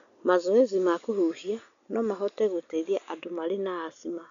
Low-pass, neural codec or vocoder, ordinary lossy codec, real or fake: 7.2 kHz; none; none; real